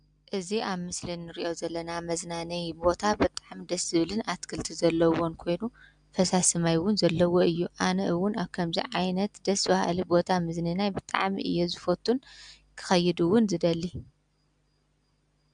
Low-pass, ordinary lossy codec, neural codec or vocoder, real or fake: 9.9 kHz; MP3, 96 kbps; none; real